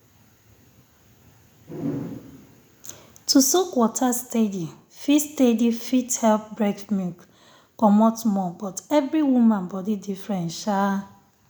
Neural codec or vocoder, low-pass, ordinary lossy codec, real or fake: none; none; none; real